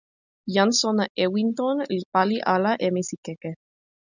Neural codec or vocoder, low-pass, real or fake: none; 7.2 kHz; real